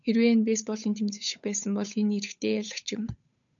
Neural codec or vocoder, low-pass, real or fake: codec, 16 kHz, 8 kbps, FunCodec, trained on Chinese and English, 25 frames a second; 7.2 kHz; fake